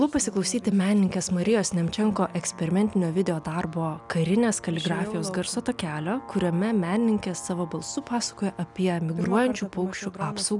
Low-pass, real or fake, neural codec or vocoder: 10.8 kHz; real; none